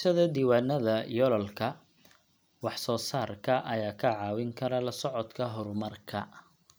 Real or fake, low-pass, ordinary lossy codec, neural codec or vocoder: real; none; none; none